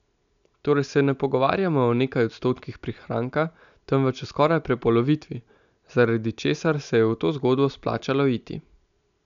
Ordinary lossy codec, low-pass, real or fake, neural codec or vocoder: none; 7.2 kHz; real; none